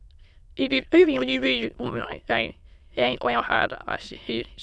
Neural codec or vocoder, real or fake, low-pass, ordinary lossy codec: autoencoder, 22.05 kHz, a latent of 192 numbers a frame, VITS, trained on many speakers; fake; none; none